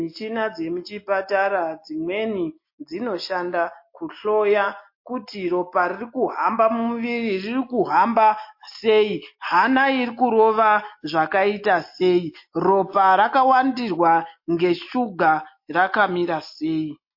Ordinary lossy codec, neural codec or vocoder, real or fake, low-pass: MP3, 32 kbps; none; real; 5.4 kHz